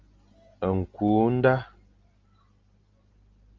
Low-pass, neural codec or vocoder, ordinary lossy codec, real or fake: 7.2 kHz; none; Opus, 32 kbps; real